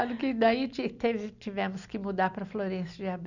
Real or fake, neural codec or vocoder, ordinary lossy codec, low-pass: real; none; none; 7.2 kHz